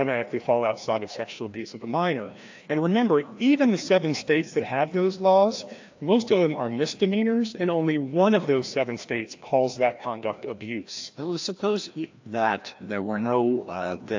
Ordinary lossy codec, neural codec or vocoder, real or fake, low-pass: AAC, 48 kbps; codec, 16 kHz, 1 kbps, FreqCodec, larger model; fake; 7.2 kHz